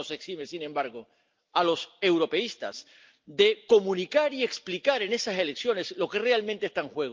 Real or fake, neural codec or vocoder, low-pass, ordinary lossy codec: real; none; 7.2 kHz; Opus, 32 kbps